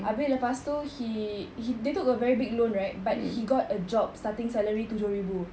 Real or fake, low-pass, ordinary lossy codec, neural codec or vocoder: real; none; none; none